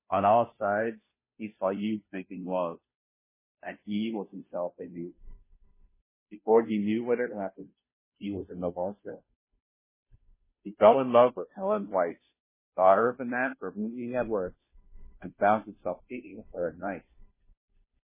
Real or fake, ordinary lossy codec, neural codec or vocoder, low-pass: fake; MP3, 16 kbps; codec, 16 kHz, 0.5 kbps, FunCodec, trained on Chinese and English, 25 frames a second; 3.6 kHz